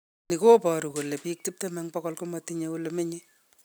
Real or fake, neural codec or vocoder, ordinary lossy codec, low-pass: real; none; none; none